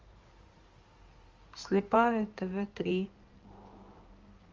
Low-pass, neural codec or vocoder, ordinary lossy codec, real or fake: 7.2 kHz; codec, 16 kHz in and 24 kHz out, 2.2 kbps, FireRedTTS-2 codec; Opus, 32 kbps; fake